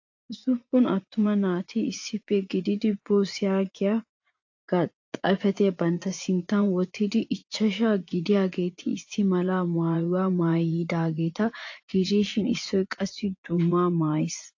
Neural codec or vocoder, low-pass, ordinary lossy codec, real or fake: vocoder, 24 kHz, 100 mel bands, Vocos; 7.2 kHz; AAC, 48 kbps; fake